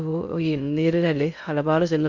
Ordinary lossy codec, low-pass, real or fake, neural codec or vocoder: none; 7.2 kHz; fake; codec, 16 kHz in and 24 kHz out, 0.6 kbps, FocalCodec, streaming, 2048 codes